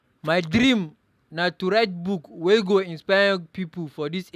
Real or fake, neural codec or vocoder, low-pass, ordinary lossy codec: real; none; 14.4 kHz; none